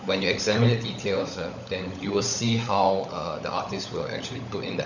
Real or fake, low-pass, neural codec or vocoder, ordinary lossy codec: fake; 7.2 kHz; codec, 16 kHz, 16 kbps, FunCodec, trained on LibriTTS, 50 frames a second; none